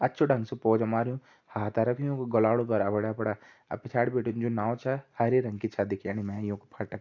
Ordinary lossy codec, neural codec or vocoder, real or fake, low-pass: AAC, 48 kbps; none; real; 7.2 kHz